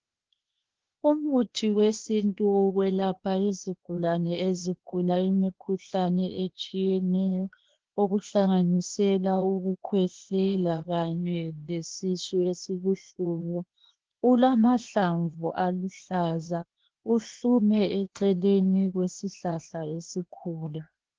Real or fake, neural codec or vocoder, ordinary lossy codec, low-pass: fake; codec, 16 kHz, 0.8 kbps, ZipCodec; Opus, 16 kbps; 7.2 kHz